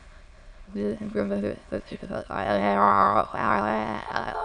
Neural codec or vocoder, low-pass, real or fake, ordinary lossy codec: autoencoder, 22.05 kHz, a latent of 192 numbers a frame, VITS, trained on many speakers; 9.9 kHz; fake; MP3, 96 kbps